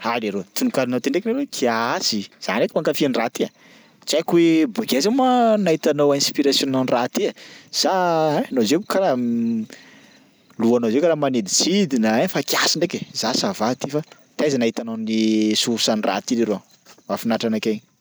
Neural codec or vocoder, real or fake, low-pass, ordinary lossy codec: none; real; none; none